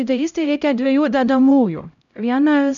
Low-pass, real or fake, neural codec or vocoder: 7.2 kHz; fake; codec, 16 kHz, 0.5 kbps, X-Codec, HuBERT features, trained on LibriSpeech